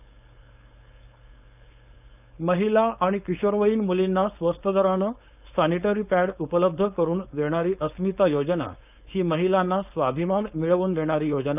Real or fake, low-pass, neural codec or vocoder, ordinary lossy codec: fake; 3.6 kHz; codec, 16 kHz, 4.8 kbps, FACodec; none